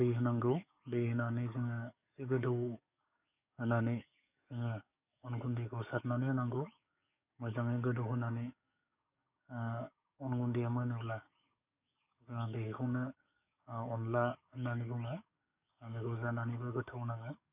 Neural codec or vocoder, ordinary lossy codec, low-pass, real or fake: codec, 44.1 kHz, 7.8 kbps, DAC; none; 3.6 kHz; fake